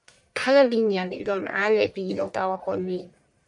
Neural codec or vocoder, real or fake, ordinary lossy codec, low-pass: codec, 44.1 kHz, 1.7 kbps, Pupu-Codec; fake; MP3, 96 kbps; 10.8 kHz